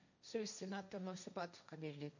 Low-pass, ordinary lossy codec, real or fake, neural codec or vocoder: 7.2 kHz; none; fake; codec, 16 kHz, 1.1 kbps, Voila-Tokenizer